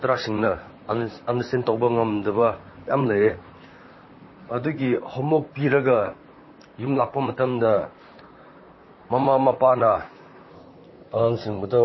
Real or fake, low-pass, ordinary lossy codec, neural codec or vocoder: fake; 7.2 kHz; MP3, 24 kbps; vocoder, 44.1 kHz, 128 mel bands, Pupu-Vocoder